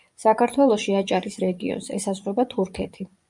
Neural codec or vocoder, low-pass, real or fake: vocoder, 24 kHz, 100 mel bands, Vocos; 10.8 kHz; fake